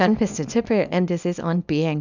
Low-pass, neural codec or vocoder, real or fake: 7.2 kHz; codec, 24 kHz, 0.9 kbps, WavTokenizer, small release; fake